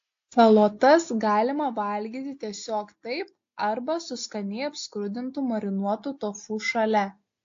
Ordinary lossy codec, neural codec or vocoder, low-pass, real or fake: AAC, 48 kbps; none; 7.2 kHz; real